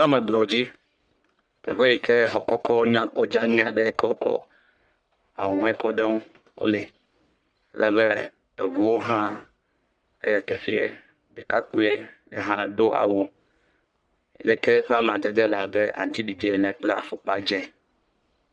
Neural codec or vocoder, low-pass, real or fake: codec, 44.1 kHz, 1.7 kbps, Pupu-Codec; 9.9 kHz; fake